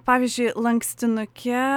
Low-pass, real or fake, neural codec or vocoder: 19.8 kHz; real; none